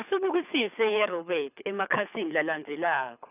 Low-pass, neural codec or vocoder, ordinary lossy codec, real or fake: 3.6 kHz; codec, 24 kHz, 6 kbps, HILCodec; none; fake